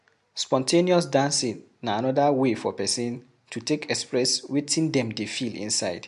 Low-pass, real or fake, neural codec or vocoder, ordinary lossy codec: 10.8 kHz; real; none; MP3, 64 kbps